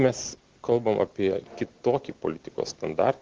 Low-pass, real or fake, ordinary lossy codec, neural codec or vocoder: 7.2 kHz; real; Opus, 16 kbps; none